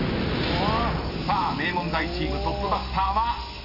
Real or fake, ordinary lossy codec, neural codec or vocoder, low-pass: real; none; none; 5.4 kHz